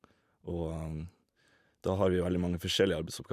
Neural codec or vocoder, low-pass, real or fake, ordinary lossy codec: vocoder, 44.1 kHz, 128 mel bands every 512 samples, BigVGAN v2; 14.4 kHz; fake; none